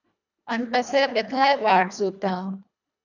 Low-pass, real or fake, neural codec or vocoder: 7.2 kHz; fake; codec, 24 kHz, 1.5 kbps, HILCodec